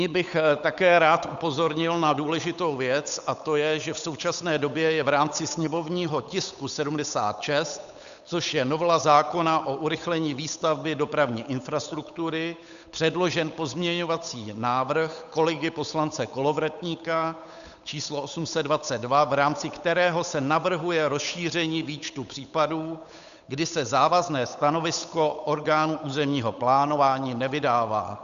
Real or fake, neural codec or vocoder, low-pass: fake; codec, 16 kHz, 8 kbps, FunCodec, trained on Chinese and English, 25 frames a second; 7.2 kHz